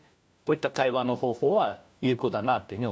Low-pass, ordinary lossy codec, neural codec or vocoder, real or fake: none; none; codec, 16 kHz, 1 kbps, FunCodec, trained on LibriTTS, 50 frames a second; fake